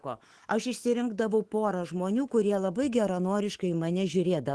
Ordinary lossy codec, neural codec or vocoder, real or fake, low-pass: Opus, 16 kbps; autoencoder, 48 kHz, 128 numbers a frame, DAC-VAE, trained on Japanese speech; fake; 10.8 kHz